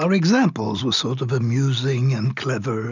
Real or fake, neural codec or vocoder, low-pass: real; none; 7.2 kHz